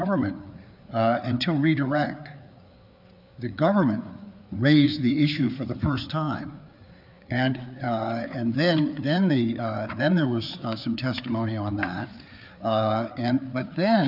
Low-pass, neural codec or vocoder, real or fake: 5.4 kHz; codec, 16 kHz, 4 kbps, FreqCodec, larger model; fake